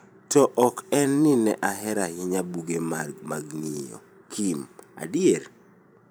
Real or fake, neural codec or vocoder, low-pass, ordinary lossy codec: fake; vocoder, 44.1 kHz, 128 mel bands every 512 samples, BigVGAN v2; none; none